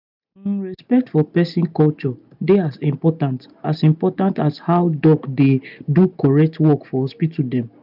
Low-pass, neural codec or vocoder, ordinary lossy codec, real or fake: 5.4 kHz; none; none; real